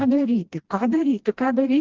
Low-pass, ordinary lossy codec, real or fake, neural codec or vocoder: 7.2 kHz; Opus, 16 kbps; fake; codec, 16 kHz, 1 kbps, FreqCodec, smaller model